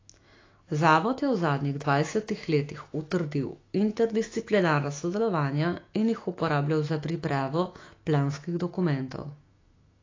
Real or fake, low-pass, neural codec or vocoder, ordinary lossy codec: fake; 7.2 kHz; autoencoder, 48 kHz, 128 numbers a frame, DAC-VAE, trained on Japanese speech; AAC, 32 kbps